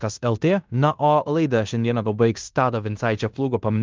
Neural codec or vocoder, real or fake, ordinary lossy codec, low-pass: codec, 16 kHz in and 24 kHz out, 0.9 kbps, LongCat-Audio-Codec, fine tuned four codebook decoder; fake; Opus, 32 kbps; 7.2 kHz